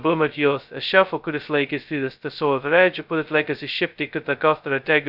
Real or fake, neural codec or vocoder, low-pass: fake; codec, 16 kHz, 0.2 kbps, FocalCodec; 5.4 kHz